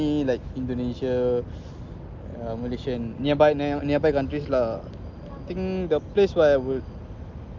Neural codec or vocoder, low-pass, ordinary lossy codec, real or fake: none; 7.2 kHz; Opus, 32 kbps; real